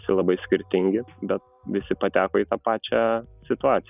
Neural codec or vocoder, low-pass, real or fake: none; 3.6 kHz; real